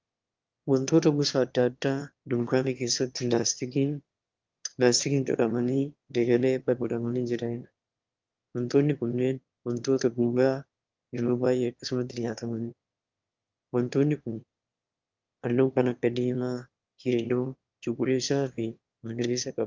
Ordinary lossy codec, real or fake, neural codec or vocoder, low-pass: Opus, 24 kbps; fake; autoencoder, 22.05 kHz, a latent of 192 numbers a frame, VITS, trained on one speaker; 7.2 kHz